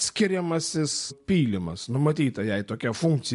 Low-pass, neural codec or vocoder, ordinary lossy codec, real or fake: 10.8 kHz; none; MP3, 64 kbps; real